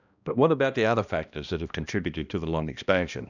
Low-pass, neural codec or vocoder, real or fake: 7.2 kHz; codec, 16 kHz, 1 kbps, X-Codec, HuBERT features, trained on balanced general audio; fake